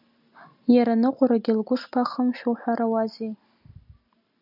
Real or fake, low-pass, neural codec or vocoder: real; 5.4 kHz; none